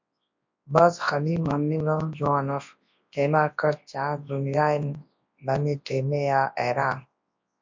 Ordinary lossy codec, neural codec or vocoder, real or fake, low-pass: MP3, 48 kbps; codec, 24 kHz, 0.9 kbps, WavTokenizer, large speech release; fake; 7.2 kHz